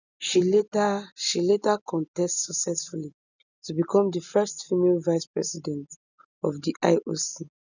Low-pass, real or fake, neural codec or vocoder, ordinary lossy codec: 7.2 kHz; real; none; none